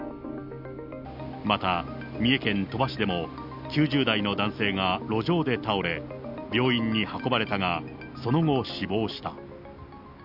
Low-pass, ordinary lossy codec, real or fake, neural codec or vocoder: 5.4 kHz; none; real; none